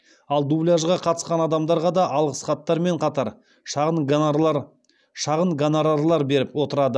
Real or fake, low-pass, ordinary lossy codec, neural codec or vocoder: real; none; none; none